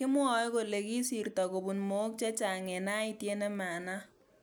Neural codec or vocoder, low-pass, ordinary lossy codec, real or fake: none; none; none; real